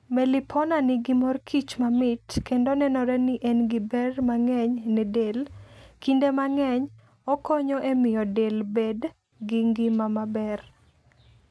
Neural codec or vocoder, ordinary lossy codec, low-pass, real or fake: none; none; none; real